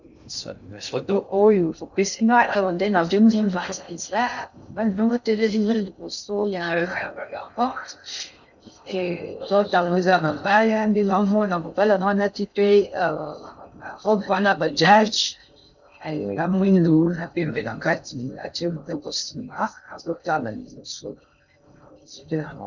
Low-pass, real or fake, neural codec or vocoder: 7.2 kHz; fake; codec, 16 kHz in and 24 kHz out, 0.6 kbps, FocalCodec, streaming, 2048 codes